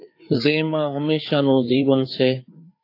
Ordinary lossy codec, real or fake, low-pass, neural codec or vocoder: AAC, 32 kbps; fake; 5.4 kHz; codec, 16 kHz, 4 kbps, FreqCodec, larger model